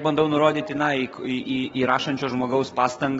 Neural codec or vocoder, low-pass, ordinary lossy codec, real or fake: codec, 44.1 kHz, 7.8 kbps, DAC; 19.8 kHz; AAC, 24 kbps; fake